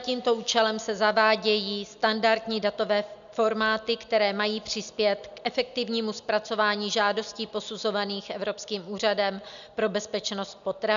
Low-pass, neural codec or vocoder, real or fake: 7.2 kHz; none; real